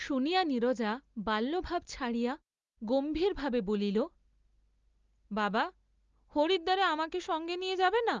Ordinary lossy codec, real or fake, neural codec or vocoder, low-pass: Opus, 32 kbps; real; none; 7.2 kHz